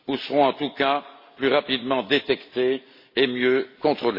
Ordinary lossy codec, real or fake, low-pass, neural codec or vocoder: MP3, 24 kbps; real; 5.4 kHz; none